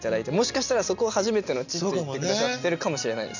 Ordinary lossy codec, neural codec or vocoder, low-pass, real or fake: none; none; 7.2 kHz; real